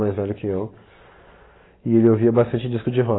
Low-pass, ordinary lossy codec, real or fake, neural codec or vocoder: 7.2 kHz; AAC, 16 kbps; real; none